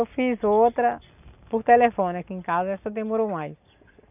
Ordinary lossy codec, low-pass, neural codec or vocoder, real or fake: none; 3.6 kHz; none; real